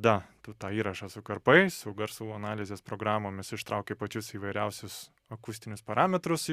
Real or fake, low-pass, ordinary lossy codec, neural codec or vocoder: real; 14.4 kHz; Opus, 64 kbps; none